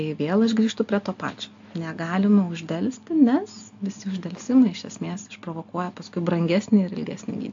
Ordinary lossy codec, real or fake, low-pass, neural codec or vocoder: AAC, 64 kbps; real; 7.2 kHz; none